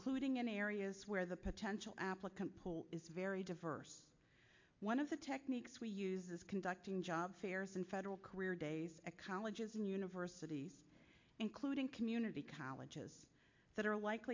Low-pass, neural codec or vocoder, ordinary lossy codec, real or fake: 7.2 kHz; none; MP3, 48 kbps; real